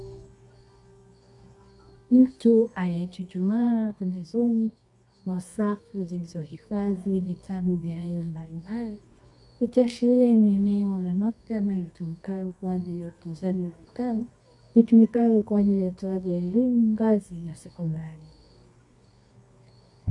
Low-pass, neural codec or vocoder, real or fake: 10.8 kHz; codec, 24 kHz, 0.9 kbps, WavTokenizer, medium music audio release; fake